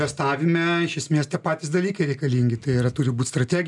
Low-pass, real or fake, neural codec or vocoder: 10.8 kHz; real; none